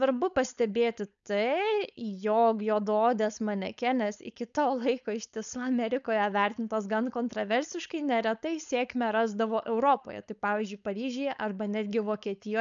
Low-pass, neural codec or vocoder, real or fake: 7.2 kHz; codec, 16 kHz, 4.8 kbps, FACodec; fake